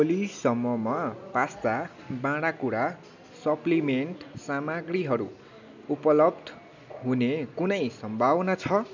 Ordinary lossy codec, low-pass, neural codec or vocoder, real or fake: none; 7.2 kHz; none; real